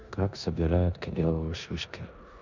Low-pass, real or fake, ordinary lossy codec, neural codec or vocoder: 7.2 kHz; fake; none; codec, 16 kHz in and 24 kHz out, 0.9 kbps, LongCat-Audio-Codec, four codebook decoder